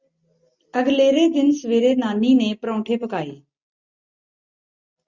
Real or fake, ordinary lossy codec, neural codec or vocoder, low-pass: real; Opus, 64 kbps; none; 7.2 kHz